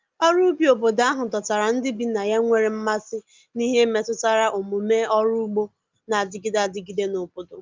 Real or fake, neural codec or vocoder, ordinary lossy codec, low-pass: real; none; Opus, 32 kbps; 7.2 kHz